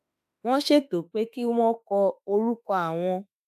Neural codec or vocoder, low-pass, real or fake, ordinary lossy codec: autoencoder, 48 kHz, 32 numbers a frame, DAC-VAE, trained on Japanese speech; 14.4 kHz; fake; AAC, 96 kbps